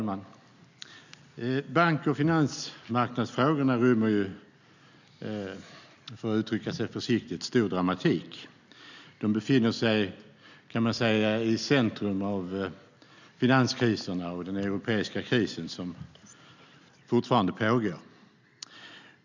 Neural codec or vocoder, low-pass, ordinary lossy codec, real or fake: none; 7.2 kHz; none; real